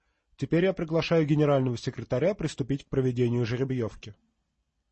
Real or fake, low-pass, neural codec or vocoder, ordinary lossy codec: real; 10.8 kHz; none; MP3, 32 kbps